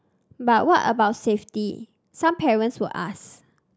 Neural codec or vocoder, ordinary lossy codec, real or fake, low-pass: none; none; real; none